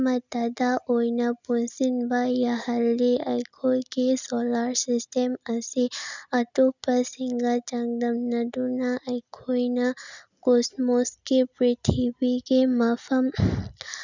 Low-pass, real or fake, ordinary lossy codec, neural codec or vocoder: 7.2 kHz; real; none; none